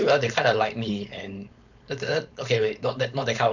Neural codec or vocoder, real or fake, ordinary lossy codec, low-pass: codec, 16 kHz, 4.8 kbps, FACodec; fake; none; 7.2 kHz